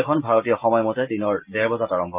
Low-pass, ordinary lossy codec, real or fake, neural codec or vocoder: 3.6 kHz; Opus, 24 kbps; real; none